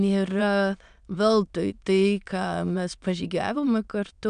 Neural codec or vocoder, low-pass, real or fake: autoencoder, 22.05 kHz, a latent of 192 numbers a frame, VITS, trained on many speakers; 9.9 kHz; fake